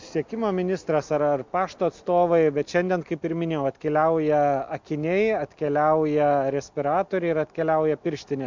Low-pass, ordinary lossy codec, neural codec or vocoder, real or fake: 7.2 kHz; MP3, 64 kbps; none; real